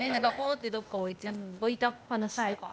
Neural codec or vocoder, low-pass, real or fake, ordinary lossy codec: codec, 16 kHz, 0.8 kbps, ZipCodec; none; fake; none